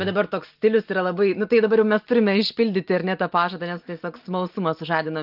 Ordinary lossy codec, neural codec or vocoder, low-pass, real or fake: Opus, 24 kbps; none; 5.4 kHz; real